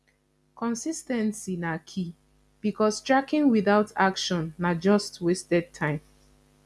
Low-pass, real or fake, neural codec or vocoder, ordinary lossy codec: none; real; none; none